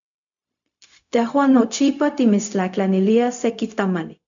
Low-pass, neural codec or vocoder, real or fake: 7.2 kHz; codec, 16 kHz, 0.4 kbps, LongCat-Audio-Codec; fake